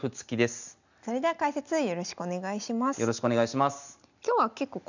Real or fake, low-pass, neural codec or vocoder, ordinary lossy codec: real; 7.2 kHz; none; none